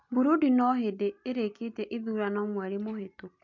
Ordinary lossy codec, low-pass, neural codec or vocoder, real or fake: MP3, 64 kbps; 7.2 kHz; none; real